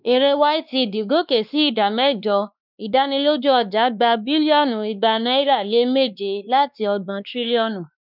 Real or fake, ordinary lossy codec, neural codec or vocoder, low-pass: fake; none; codec, 16 kHz, 2 kbps, X-Codec, WavLM features, trained on Multilingual LibriSpeech; 5.4 kHz